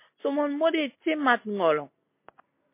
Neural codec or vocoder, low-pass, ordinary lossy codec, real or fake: none; 3.6 kHz; MP3, 24 kbps; real